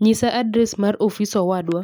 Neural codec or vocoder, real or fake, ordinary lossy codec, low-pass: none; real; none; none